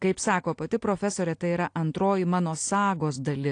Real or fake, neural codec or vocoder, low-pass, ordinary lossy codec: real; none; 9.9 kHz; AAC, 48 kbps